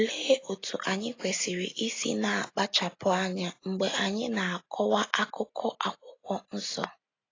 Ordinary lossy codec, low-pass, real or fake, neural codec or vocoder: AAC, 32 kbps; 7.2 kHz; real; none